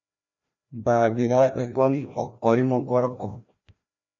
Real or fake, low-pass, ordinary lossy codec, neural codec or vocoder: fake; 7.2 kHz; AAC, 64 kbps; codec, 16 kHz, 1 kbps, FreqCodec, larger model